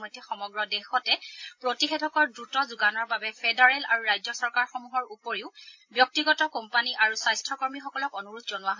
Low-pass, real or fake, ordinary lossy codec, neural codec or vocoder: 7.2 kHz; real; AAC, 48 kbps; none